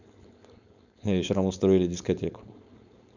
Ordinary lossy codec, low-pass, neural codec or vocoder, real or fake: none; 7.2 kHz; codec, 16 kHz, 4.8 kbps, FACodec; fake